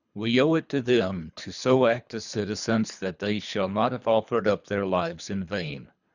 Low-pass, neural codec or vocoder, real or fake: 7.2 kHz; codec, 24 kHz, 3 kbps, HILCodec; fake